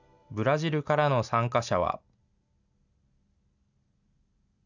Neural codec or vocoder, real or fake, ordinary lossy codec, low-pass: none; real; none; 7.2 kHz